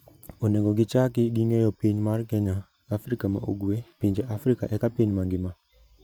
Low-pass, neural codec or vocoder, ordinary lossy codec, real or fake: none; vocoder, 44.1 kHz, 128 mel bands every 512 samples, BigVGAN v2; none; fake